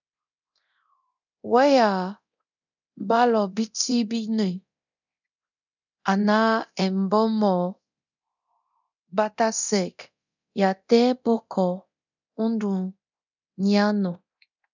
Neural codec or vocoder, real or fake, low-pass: codec, 24 kHz, 0.9 kbps, DualCodec; fake; 7.2 kHz